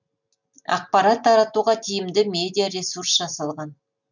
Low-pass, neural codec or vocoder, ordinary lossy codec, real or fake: 7.2 kHz; none; none; real